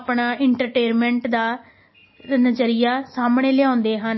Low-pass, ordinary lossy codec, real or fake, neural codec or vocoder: 7.2 kHz; MP3, 24 kbps; real; none